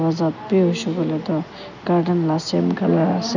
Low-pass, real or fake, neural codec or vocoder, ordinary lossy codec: 7.2 kHz; real; none; none